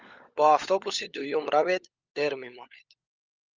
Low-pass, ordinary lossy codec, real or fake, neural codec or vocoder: 7.2 kHz; Opus, 32 kbps; fake; codec, 16 kHz, 16 kbps, FunCodec, trained on LibriTTS, 50 frames a second